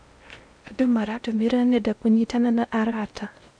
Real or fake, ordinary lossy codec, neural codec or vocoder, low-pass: fake; none; codec, 16 kHz in and 24 kHz out, 0.6 kbps, FocalCodec, streaming, 2048 codes; 9.9 kHz